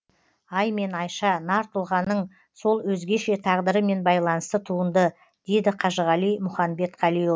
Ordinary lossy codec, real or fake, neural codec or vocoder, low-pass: none; real; none; none